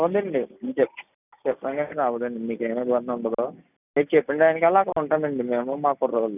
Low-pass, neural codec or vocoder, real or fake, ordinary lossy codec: 3.6 kHz; none; real; none